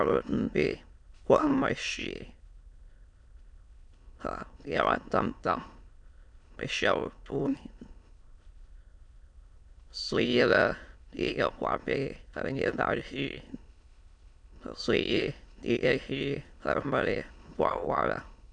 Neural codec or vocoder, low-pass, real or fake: autoencoder, 22.05 kHz, a latent of 192 numbers a frame, VITS, trained on many speakers; 9.9 kHz; fake